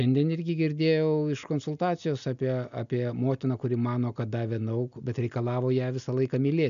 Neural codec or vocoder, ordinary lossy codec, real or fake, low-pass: none; MP3, 96 kbps; real; 7.2 kHz